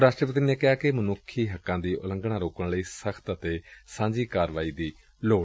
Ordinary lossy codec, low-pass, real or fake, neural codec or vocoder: none; none; real; none